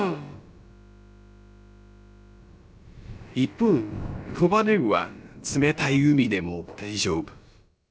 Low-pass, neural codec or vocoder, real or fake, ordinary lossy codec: none; codec, 16 kHz, about 1 kbps, DyCAST, with the encoder's durations; fake; none